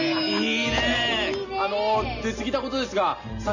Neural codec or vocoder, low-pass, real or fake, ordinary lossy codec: none; 7.2 kHz; real; none